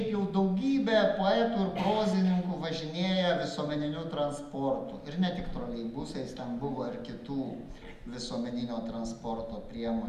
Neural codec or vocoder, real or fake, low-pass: none; real; 14.4 kHz